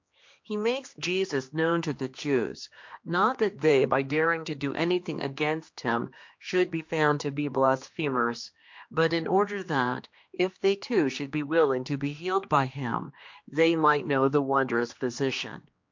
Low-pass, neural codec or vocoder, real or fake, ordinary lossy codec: 7.2 kHz; codec, 16 kHz, 2 kbps, X-Codec, HuBERT features, trained on general audio; fake; MP3, 48 kbps